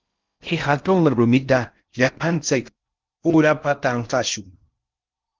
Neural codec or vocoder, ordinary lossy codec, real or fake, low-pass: codec, 16 kHz in and 24 kHz out, 0.6 kbps, FocalCodec, streaming, 4096 codes; Opus, 32 kbps; fake; 7.2 kHz